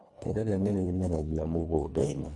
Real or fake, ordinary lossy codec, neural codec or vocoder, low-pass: fake; none; codec, 24 kHz, 1.5 kbps, HILCodec; 10.8 kHz